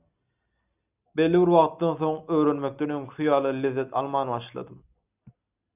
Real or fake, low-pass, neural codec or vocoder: real; 3.6 kHz; none